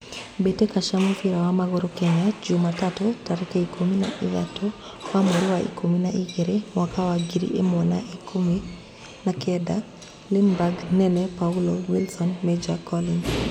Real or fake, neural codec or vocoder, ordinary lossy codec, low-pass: real; none; none; 19.8 kHz